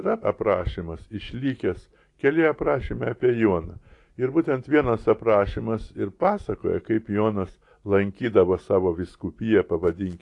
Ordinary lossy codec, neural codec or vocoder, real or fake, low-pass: AAC, 48 kbps; none; real; 10.8 kHz